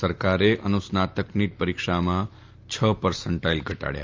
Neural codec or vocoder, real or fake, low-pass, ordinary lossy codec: none; real; 7.2 kHz; Opus, 32 kbps